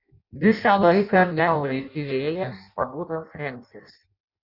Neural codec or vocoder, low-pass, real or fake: codec, 16 kHz in and 24 kHz out, 0.6 kbps, FireRedTTS-2 codec; 5.4 kHz; fake